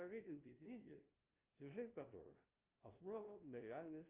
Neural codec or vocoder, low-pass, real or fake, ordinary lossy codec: codec, 16 kHz, 0.5 kbps, FunCodec, trained on LibriTTS, 25 frames a second; 3.6 kHz; fake; Opus, 32 kbps